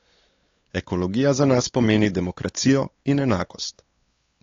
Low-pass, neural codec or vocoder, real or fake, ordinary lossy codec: 7.2 kHz; codec, 16 kHz, 4 kbps, X-Codec, WavLM features, trained on Multilingual LibriSpeech; fake; AAC, 32 kbps